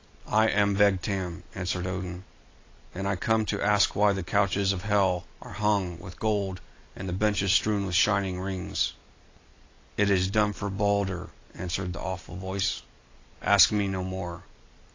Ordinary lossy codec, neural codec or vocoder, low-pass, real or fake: AAC, 32 kbps; none; 7.2 kHz; real